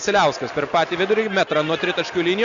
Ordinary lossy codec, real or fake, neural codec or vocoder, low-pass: Opus, 64 kbps; real; none; 7.2 kHz